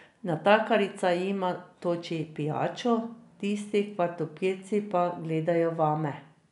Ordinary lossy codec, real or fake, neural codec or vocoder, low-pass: none; real; none; 10.8 kHz